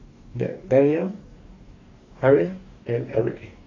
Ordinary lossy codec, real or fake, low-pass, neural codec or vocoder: AAC, 32 kbps; fake; 7.2 kHz; codec, 44.1 kHz, 2.6 kbps, DAC